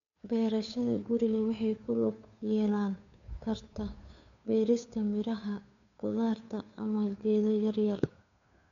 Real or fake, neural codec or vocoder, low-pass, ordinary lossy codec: fake; codec, 16 kHz, 2 kbps, FunCodec, trained on Chinese and English, 25 frames a second; 7.2 kHz; none